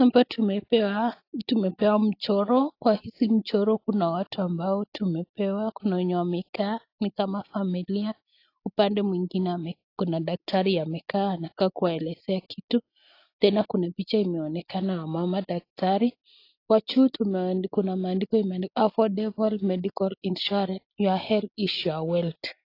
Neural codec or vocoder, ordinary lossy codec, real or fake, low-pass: none; AAC, 32 kbps; real; 5.4 kHz